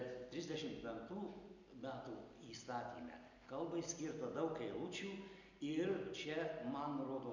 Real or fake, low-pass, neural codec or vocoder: real; 7.2 kHz; none